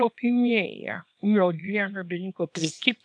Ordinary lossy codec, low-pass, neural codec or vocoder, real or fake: MP3, 96 kbps; 9.9 kHz; codec, 24 kHz, 0.9 kbps, WavTokenizer, small release; fake